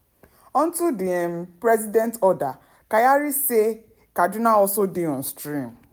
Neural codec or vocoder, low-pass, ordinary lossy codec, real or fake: none; none; none; real